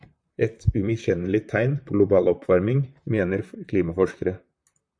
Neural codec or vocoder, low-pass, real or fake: vocoder, 44.1 kHz, 128 mel bands, Pupu-Vocoder; 9.9 kHz; fake